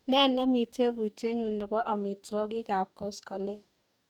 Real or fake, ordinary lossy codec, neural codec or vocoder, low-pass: fake; none; codec, 44.1 kHz, 2.6 kbps, DAC; 19.8 kHz